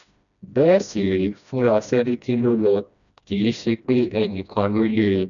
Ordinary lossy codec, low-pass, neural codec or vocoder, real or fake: none; 7.2 kHz; codec, 16 kHz, 1 kbps, FreqCodec, smaller model; fake